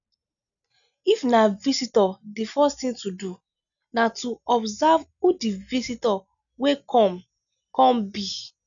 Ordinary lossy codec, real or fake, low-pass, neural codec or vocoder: none; real; 7.2 kHz; none